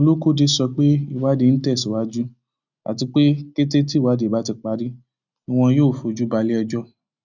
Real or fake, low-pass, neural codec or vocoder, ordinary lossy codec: real; 7.2 kHz; none; none